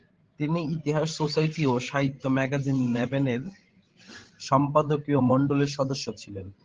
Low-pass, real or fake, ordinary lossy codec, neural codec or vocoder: 7.2 kHz; fake; Opus, 16 kbps; codec, 16 kHz, 16 kbps, FreqCodec, larger model